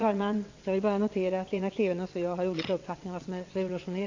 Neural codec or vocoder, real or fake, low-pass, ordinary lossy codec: vocoder, 22.05 kHz, 80 mel bands, WaveNeXt; fake; 7.2 kHz; AAC, 48 kbps